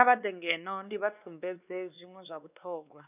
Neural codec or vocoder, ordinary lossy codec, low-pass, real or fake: codec, 16 kHz, 2 kbps, X-Codec, WavLM features, trained on Multilingual LibriSpeech; none; 3.6 kHz; fake